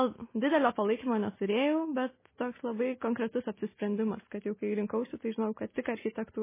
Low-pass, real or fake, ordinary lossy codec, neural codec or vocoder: 3.6 kHz; real; MP3, 16 kbps; none